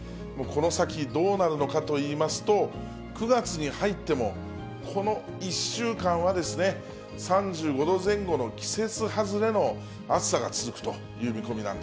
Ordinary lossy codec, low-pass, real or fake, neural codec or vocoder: none; none; real; none